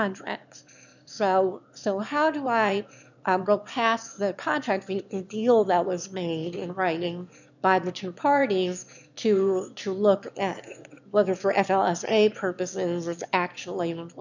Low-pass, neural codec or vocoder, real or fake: 7.2 kHz; autoencoder, 22.05 kHz, a latent of 192 numbers a frame, VITS, trained on one speaker; fake